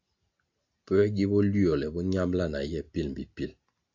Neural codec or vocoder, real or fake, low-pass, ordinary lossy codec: none; real; 7.2 kHz; AAC, 48 kbps